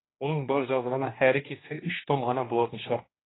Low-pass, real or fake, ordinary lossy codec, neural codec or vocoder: 7.2 kHz; fake; AAC, 16 kbps; codec, 16 kHz, 2 kbps, X-Codec, HuBERT features, trained on general audio